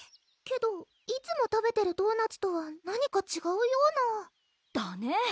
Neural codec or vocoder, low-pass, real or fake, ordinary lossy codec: none; none; real; none